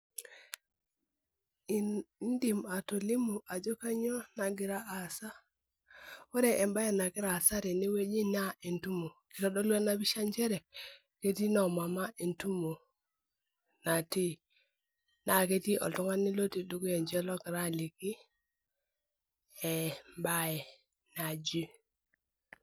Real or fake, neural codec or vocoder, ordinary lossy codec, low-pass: real; none; none; none